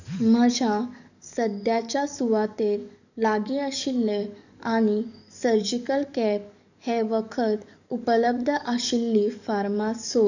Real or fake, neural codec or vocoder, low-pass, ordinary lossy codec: fake; codec, 44.1 kHz, 7.8 kbps, DAC; 7.2 kHz; none